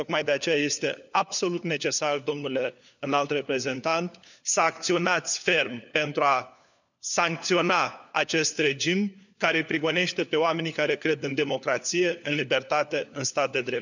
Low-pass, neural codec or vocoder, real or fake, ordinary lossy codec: 7.2 kHz; codec, 16 kHz, 4 kbps, FunCodec, trained on LibriTTS, 50 frames a second; fake; none